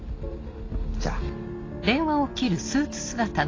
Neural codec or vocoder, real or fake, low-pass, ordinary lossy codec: none; real; 7.2 kHz; AAC, 32 kbps